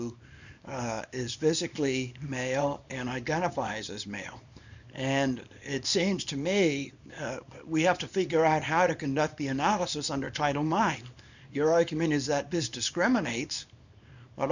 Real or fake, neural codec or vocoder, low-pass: fake; codec, 24 kHz, 0.9 kbps, WavTokenizer, small release; 7.2 kHz